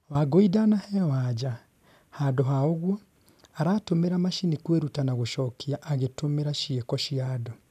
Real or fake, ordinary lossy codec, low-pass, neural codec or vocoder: real; none; 14.4 kHz; none